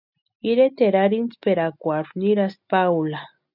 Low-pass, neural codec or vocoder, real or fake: 5.4 kHz; none; real